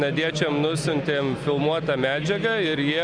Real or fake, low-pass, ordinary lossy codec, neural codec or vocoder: real; 9.9 kHz; Opus, 64 kbps; none